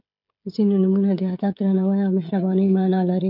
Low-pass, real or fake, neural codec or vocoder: 5.4 kHz; fake; codec, 16 kHz, 16 kbps, FreqCodec, smaller model